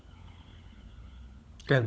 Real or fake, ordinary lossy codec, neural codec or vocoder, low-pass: fake; none; codec, 16 kHz, 16 kbps, FunCodec, trained on LibriTTS, 50 frames a second; none